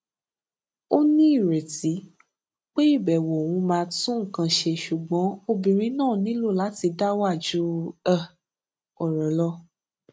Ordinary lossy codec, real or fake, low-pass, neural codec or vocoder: none; real; none; none